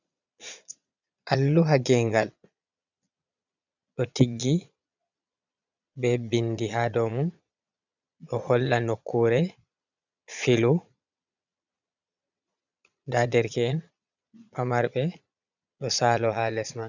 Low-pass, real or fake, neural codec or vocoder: 7.2 kHz; fake; vocoder, 22.05 kHz, 80 mel bands, Vocos